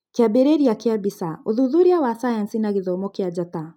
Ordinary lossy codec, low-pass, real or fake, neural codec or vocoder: none; 19.8 kHz; real; none